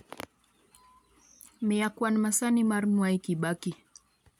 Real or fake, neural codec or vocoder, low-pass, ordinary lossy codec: real; none; 19.8 kHz; none